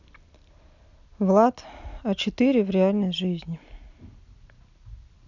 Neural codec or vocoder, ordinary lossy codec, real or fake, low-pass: none; none; real; 7.2 kHz